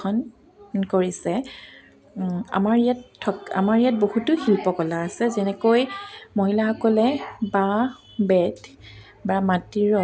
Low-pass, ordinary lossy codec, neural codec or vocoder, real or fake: none; none; none; real